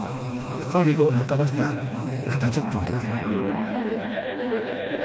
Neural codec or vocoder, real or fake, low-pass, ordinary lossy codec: codec, 16 kHz, 1 kbps, FreqCodec, smaller model; fake; none; none